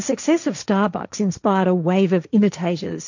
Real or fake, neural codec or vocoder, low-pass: fake; codec, 16 kHz, 1.1 kbps, Voila-Tokenizer; 7.2 kHz